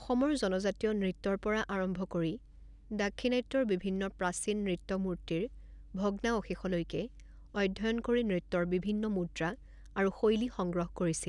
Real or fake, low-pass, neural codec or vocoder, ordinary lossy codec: real; 10.8 kHz; none; none